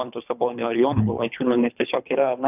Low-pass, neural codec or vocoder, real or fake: 3.6 kHz; codec, 24 kHz, 3 kbps, HILCodec; fake